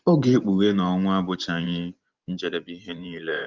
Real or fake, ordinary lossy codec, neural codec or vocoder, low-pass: fake; Opus, 32 kbps; vocoder, 24 kHz, 100 mel bands, Vocos; 7.2 kHz